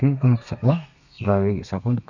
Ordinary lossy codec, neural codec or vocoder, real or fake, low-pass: none; codec, 32 kHz, 1.9 kbps, SNAC; fake; 7.2 kHz